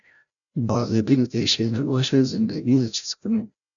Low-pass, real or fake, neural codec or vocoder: 7.2 kHz; fake; codec, 16 kHz, 0.5 kbps, FreqCodec, larger model